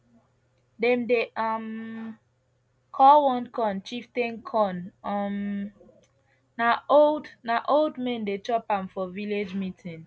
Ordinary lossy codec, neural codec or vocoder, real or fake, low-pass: none; none; real; none